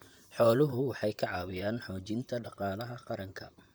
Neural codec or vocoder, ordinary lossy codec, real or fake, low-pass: vocoder, 44.1 kHz, 128 mel bands, Pupu-Vocoder; none; fake; none